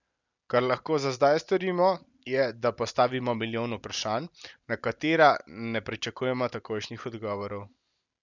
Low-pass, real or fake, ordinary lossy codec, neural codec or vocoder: 7.2 kHz; real; none; none